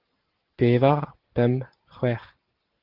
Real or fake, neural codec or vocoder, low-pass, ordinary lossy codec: real; none; 5.4 kHz; Opus, 16 kbps